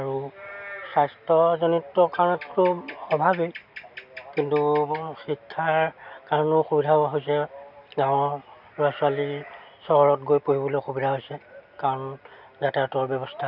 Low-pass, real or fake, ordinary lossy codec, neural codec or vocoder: 5.4 kHz; real; none; none